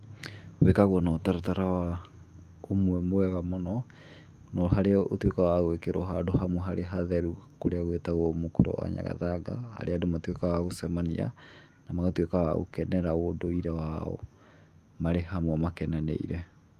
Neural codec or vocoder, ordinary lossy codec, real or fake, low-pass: autoencoder, 48 kHz, 128 numbers a frame, DAC-VAE, trained on Japanese speech; Opus, 32 kbps; fake; 14.4 kHz